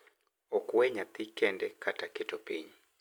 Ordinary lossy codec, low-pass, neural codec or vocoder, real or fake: none; none; none; real